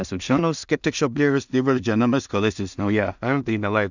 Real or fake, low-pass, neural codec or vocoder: fake; 7.2 kHz; codec, 16 kHz in and 24 kHz out, 0.4 kbps, LongCat-Audio-Codec, two codebook decoder